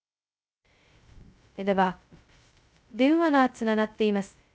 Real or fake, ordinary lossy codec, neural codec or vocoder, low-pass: fake; none; codec, 16 kHz, 0.2 kbps, FocalCodec; none